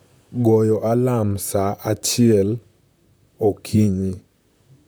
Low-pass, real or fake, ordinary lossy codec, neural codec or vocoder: none; fake; none; vocoder, 44.1 kHz, 128 mel bands, Pupu-Vocoder